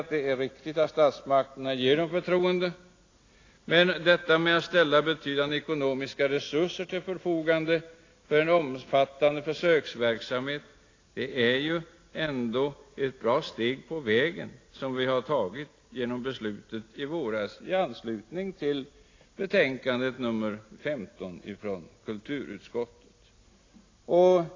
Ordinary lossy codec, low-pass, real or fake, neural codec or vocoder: AAC, 32 kbps; 7.2 kHz; real; none